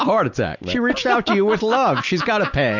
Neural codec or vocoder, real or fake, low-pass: none; real; 7.2 kHz